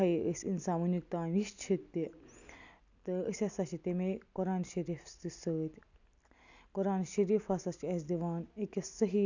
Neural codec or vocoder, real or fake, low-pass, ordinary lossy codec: none; real; 7.2 kHz; none